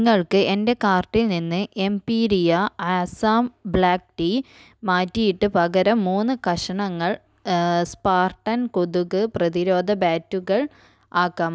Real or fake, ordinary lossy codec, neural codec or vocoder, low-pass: real; none; none; none